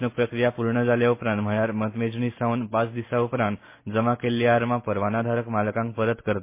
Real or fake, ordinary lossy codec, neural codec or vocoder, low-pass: real; MP3, 24 kbps; none; 3.6 kHz